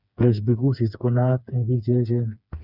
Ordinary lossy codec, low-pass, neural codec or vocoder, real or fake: none; 5.4 kHz; codec, 16 kHz, 4 kbps, FreqCodec, smaller model; fake